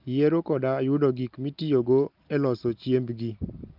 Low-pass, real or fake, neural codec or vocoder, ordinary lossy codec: 5.4 kHz; real; none; Opus, 32 kbps